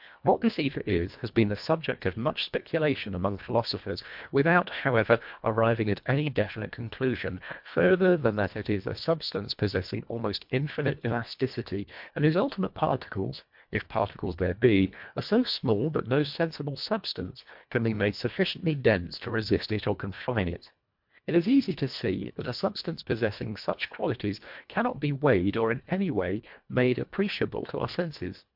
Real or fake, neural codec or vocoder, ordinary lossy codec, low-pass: fake; codec, 24 kHz, 1.5 kbps, HILCodec; MP3, 48 kbps; 5.4 kHz